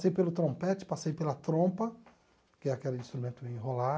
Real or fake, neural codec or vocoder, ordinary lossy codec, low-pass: real; none; none; none